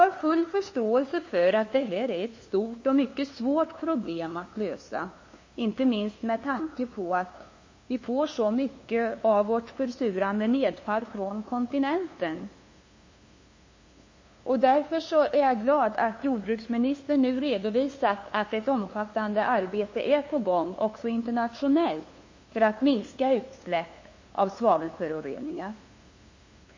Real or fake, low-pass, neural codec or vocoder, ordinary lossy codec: fake; 7.2 kHz; codec, 16 kHz, 2 kbps, FunCodec, trained on LibriTTS, 25 frames a second; MP3, 32 kbps